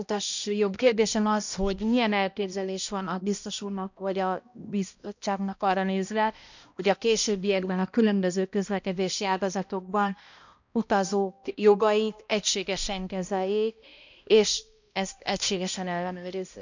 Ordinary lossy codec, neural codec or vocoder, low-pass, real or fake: none; codec, 16 kHz, 1 kbps, X-Codec, HuBERT features, trained on balanced general audio; 7.2 kHz; fake